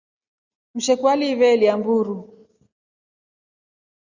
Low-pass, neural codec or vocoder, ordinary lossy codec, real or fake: 7.2 kHz; none; Opus, 64 kbps; real